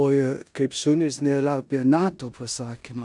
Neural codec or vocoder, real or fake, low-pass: codec, 16 kHz in and 24 kHz out, 0.9 kbps, LongCat-Audio-Codec, four codebook decoder; fake; 10.8 kHz